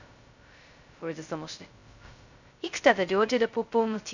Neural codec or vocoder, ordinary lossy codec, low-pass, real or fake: codec, 16 kHz, 0.2 kbps, FocalCodec; none; 7.2 kHz; fake